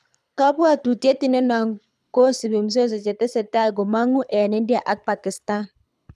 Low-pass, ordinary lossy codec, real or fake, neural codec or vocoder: none; none; fake; codec, 24 kHz, 6 kbps, HILCodec